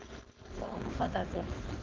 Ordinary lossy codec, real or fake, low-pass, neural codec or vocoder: Opus, 16 kbps; fake; 7.2 kHz; codec, 16 kHz, 4.8 kbps, FACodec